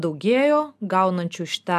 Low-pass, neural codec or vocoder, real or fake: 14.4 kHz; none; real